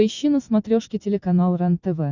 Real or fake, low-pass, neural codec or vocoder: real; 7.2 kHz; none